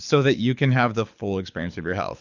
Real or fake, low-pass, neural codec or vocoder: fake; 7.2 kHz; codec, 24 kHz, 6 kbps, HILCodec